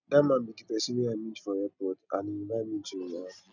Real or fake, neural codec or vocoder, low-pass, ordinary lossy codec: real; none; 7.2 kHz; none